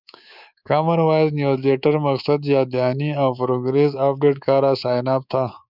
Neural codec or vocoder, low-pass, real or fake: codec, 24 kHz, 3.1 kbps, DualCodec; 5.4 kHz; fake